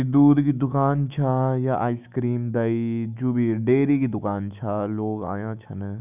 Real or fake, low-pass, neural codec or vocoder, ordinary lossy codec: fake; 3.6 kHz; autoencoder, 48 kHz, 128 numbers a frame, DAC-VAE, trained on Japanese speech; none